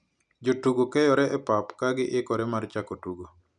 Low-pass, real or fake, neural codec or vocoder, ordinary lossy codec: 9.9 kHz; real; none; none